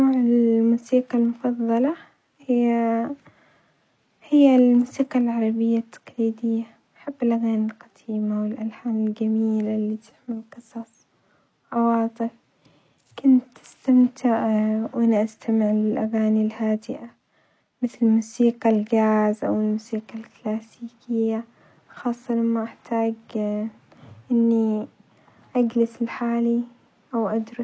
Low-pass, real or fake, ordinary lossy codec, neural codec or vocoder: none; real; none; none